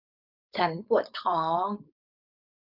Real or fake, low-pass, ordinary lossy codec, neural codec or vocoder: fake; 5.4 kHz; none; codec, 16 kHz, 4.8 kbps, FACodec